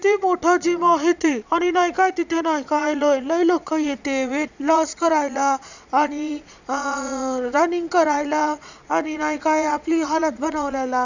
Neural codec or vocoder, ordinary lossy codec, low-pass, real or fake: vocoder, 22.05 kHz, 80 mel bands, Vocos; none; 7.2 kHz; fake